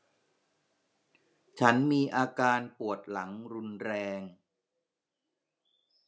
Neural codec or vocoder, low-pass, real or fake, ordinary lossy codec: none; none; real; none